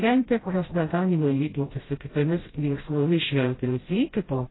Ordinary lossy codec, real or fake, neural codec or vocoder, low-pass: AAC, 16 kbps; fake; codec, 16 kHz, 0.5 kbps, FreqCodec, smaller model; 7.2 kHz